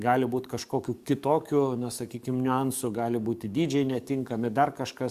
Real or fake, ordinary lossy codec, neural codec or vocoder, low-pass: fake; Opus, 64 kbps; codec, 44.1 kHz, 7.8 kbps, DAC; 14.4 kHz